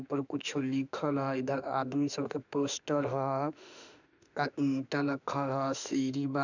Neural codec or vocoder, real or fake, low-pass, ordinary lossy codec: autoencoder, 48 kHz, 32 numbers a frame, DAC-VAE, trained on Japanese speech; fake; 7.2 kHz; none